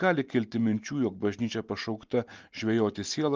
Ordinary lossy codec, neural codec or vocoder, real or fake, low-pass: Opus, 32 kbps; none; real; 7.2 kHz